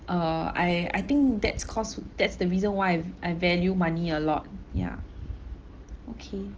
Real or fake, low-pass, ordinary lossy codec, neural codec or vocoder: real; 7.2 kHz; Opus, 16 kbps; none